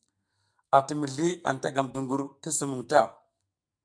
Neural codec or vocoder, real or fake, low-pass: codec, 44.1 kHz, 2.6 kbps, SNAC; fake; 9.9 kHz